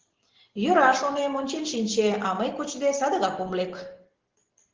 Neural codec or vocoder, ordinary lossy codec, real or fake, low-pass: none; Opus, 16 kbps; real; 7.2 kHz